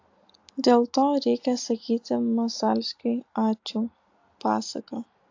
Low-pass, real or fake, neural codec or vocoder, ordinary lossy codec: 7.2 kHz; real; none; AAC, 48 kbps